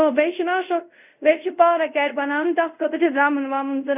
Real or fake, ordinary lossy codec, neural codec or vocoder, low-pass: fake; none; codec, 24 kHz, 0.5 kbps, DualCodec; 3.6 kHz